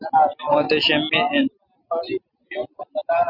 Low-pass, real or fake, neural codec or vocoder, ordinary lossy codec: 5.4 kHz; real; none; Opus, 64 kbps